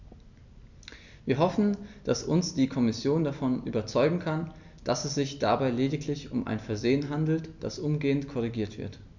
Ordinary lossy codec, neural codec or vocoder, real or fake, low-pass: none; none; real; 7.2 kHz